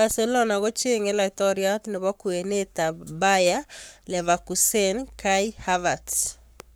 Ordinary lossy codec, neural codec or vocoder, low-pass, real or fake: none; codec, 44.1 kHz, 7.8 kbps, Pupu-Codec; none; fake